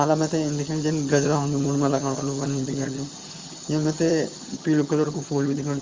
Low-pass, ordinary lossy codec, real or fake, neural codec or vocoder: 7.2 kHz; Opus, 32 kbps; fake; vocoder, 22.05 kHz, 80 mel bands, HiFi-GAN